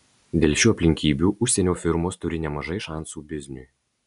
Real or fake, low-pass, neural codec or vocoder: real; 10.8 kHz; none